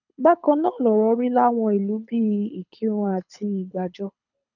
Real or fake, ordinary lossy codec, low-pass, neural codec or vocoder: fake; none; 7.2 kHz; codec, 24 kHz, 6 kbps, HILCodec